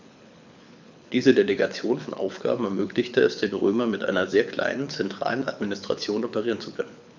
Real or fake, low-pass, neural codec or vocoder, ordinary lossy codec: fake; 7.2 kHz; codec, 24 kHz, 6 kbps, HILCodec; none